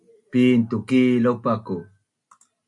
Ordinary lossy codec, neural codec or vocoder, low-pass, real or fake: MP3, 96 kbps; none; 10.8 kHz; real